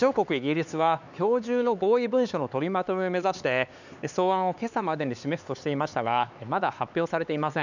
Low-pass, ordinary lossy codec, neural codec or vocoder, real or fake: 7.2 kHz; none; codec, 16 kHz, 4 kbps, X-Codec, HuBERT features, trained on LibriSpeech; fake